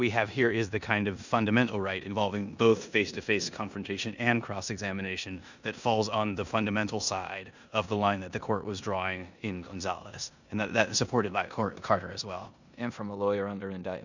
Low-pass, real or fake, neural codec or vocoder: 7.2 kHz; fake; codec, 16 kHz in and 24 kHz out, 0.9 kbps, LongCat-Audio-Codec, four codebook decoder